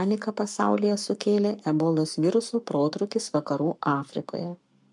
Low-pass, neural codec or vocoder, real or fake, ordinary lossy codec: 10.8 kHz; codec, 44.1 kHz, 7.8 kbps, Pupu-Codec; fake; MP3, 96 kbps